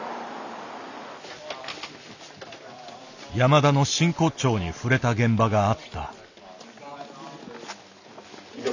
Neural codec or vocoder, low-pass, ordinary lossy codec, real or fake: none; 7.2 kHz; none; real